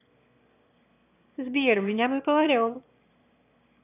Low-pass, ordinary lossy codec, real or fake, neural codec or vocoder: 3.6 kHz; none; fake; autoencoder, 22.05 kHz, a latent of 192 numbers a frame, VITS, trained on one speaker